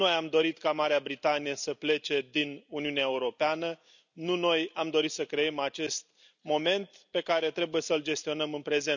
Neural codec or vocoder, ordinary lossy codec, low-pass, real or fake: none; none; 7.2 kHz; real